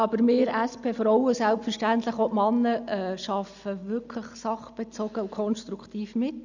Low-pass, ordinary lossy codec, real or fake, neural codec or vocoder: 7.2 kHz; none; real; none